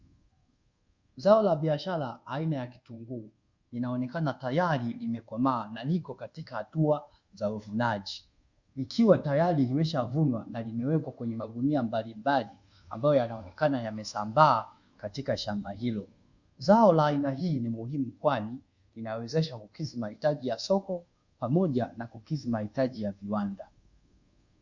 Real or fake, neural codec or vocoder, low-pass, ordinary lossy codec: fake; codec, 24 kHz, 1.2 kbps, DualCodec; 7.2 kHz; Opus, 64 kbps